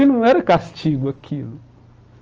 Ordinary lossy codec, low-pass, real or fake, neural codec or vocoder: Opus, 24 kbps; 7.2 kHz; real; none